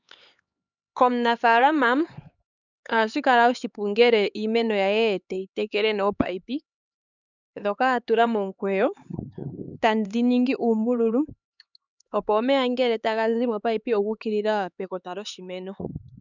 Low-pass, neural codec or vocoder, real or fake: 7.2 kHz; codec, 16 kHz, 4 kbps, X-Codec, HuBERT features, trained on LibriSpeech; fake